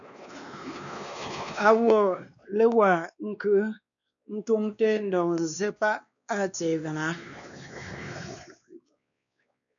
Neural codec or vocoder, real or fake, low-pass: codec, 16 kHz, 2 kbps, X-Codec, WavLM features, trained on Multilingual LibriSpeech; fake; 7.2 kHz